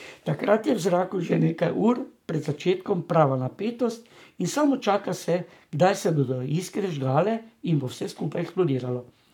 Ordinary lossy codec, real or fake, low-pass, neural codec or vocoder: none; fake; 19.8 kHz; codec, 44.1 kHz, 7.8 kbps, Pupu-Codec